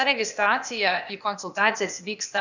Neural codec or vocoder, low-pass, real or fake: codec, 16 kHz, 0.8 kbps, ZipCodec; 7.2 kHz; fake